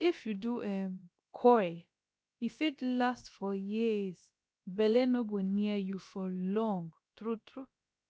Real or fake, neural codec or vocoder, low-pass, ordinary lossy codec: fake; codec, 16 kHz, about 1 kbps, DyCAST, with the encoder's durations; none; none